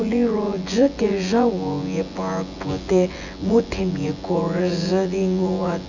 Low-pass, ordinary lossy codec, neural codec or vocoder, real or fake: 7.2 kHz; none; vocoder, 24 kHz, 100 mel bands, Vocos; fake